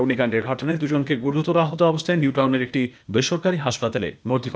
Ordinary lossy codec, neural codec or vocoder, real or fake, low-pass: none; codec, 16 kHz, 0.8 kbps, ZipCodec; fake; none